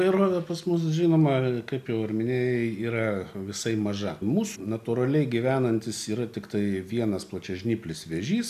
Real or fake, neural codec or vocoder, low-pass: real; none; 14.4 kHz